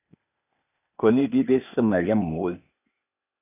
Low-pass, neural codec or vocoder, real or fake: 3.6 kHz; codec, 16 kHz, 0.8 kbps, ZipCodec; fake